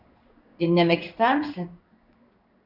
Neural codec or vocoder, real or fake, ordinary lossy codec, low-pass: codec, 16 kHz in and 24 kHz out, 1 kbps, XY-Tokenizer; fake; Opus, 64 kbps; 5.4 kHz